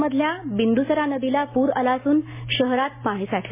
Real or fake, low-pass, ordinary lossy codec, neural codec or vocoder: real; 3.6 kHz; MP3, 16 kbps; none